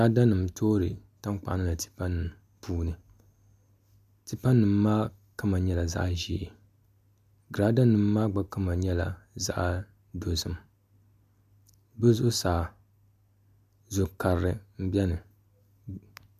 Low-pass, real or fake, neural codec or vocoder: 14.4 kHz; real; none